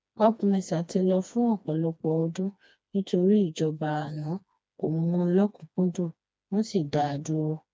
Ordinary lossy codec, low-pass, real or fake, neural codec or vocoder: none; none; fake; codec, 16 kHz, 2 kbps, FreqCodec, smaller model